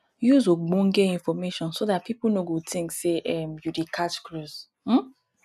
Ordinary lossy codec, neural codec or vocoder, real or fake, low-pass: none; none; real; 14.4 kHz